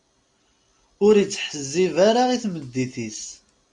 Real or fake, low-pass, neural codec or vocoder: real; 9.9 kHz; none